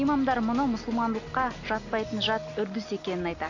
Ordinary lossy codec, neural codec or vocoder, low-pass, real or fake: AAC, 48 kbps; none; 7.2 kHz; real